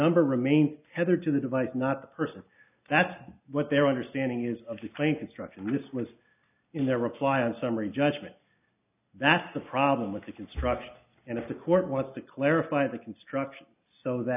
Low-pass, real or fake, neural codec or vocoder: 3.6 kHz; real; none